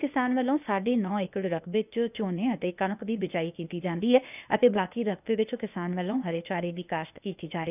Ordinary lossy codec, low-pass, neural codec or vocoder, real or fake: none; 3.6 kHz; codec, 16 kHz, 0.8 kbps, ZipCodec; fake